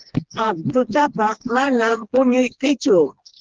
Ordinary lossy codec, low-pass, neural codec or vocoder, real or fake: Opus, 16 kbps; 7.2 kHz; codec, 16 kHz, 2 kbps, FreqCodec, smaller model; fake